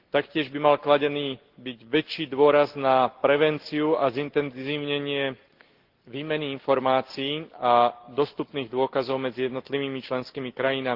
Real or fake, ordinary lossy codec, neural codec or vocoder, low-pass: real; Opus, 16 kbps; none; 5.4 kHz